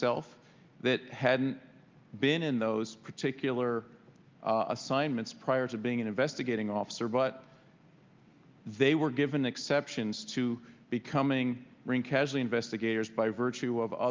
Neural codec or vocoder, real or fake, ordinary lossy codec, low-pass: none; real; Opus, 24 kbps; 7.2 kHz